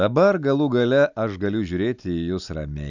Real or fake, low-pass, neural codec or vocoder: real; 7.2 kHz; none